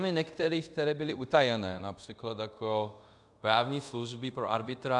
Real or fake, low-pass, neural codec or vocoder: fake; 10.8 kHz; codec, 24 kHz, 0.5 kbps, DualCodec